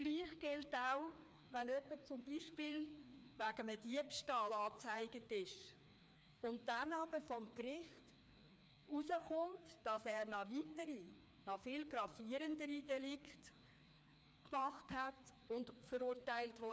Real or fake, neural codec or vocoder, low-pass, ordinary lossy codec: fake; codec, 16 kHz, 2 kbps, FreqCodec, larger model; none; none